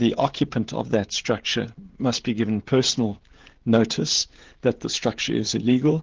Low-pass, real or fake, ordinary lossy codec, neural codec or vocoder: 7.2 kHz; fake; Opus, 16 kbps; codec, 16 kHz, 16 kbps, FreqCodec, smaller model